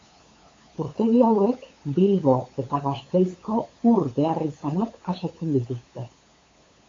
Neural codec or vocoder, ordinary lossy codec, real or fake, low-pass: codec, 16 kHz, 8 kbps, FunCodec, trained on LibriTTS, 25 frames a second; AAC, 32 kbps; fake; 7.2 kHz